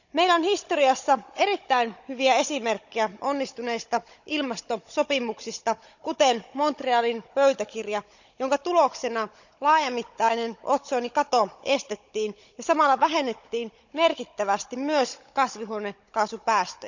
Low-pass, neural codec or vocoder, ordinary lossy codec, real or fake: 7.2 kHz; codec, 16 kHz, 16 kbps, FunCodec, trained on Chinese and English, 50 frames a second; none; fake